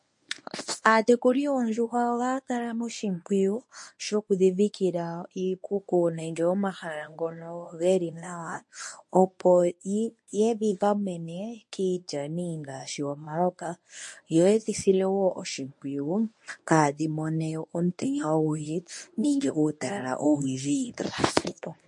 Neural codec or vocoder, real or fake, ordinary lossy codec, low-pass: codec, 24 kHz, 0.9 kbps, WavTokenizer, medium speech release version 1; fake; MP3, 48 kbps; 10.8 kHz